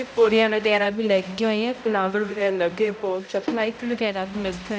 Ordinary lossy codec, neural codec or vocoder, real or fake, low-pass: none; codec, 16 kHz, 0.5 kbps, X-Codec, HuBERT features, trained on balanced general audio; fake; none